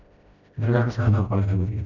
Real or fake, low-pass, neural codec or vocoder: fake; 7.2 kHz; codec, 16 kHz, 0.5 kbps, FreqCodec, smaller model